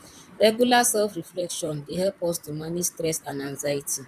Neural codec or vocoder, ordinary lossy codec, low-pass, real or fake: vocoder, 44.1 kHz, 128 mel bands, Pupu-Vocoder; none; 14.4 kHz; fake